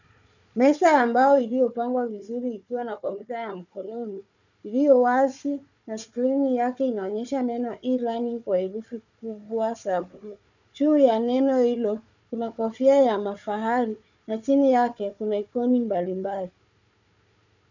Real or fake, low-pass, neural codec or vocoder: fake; 7.2 kHz; codec, 16 kHz, 4 kbps, FunCodec, trained on Chinese and English, 50 frames a second